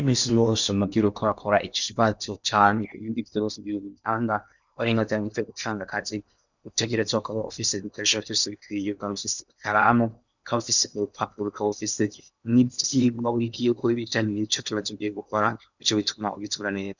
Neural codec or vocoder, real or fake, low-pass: codec, 16 kHz in and 24 kHz out, 0.8 kbps, FocalCodec, streaming, 65536 codes; fake; 7.2 kHz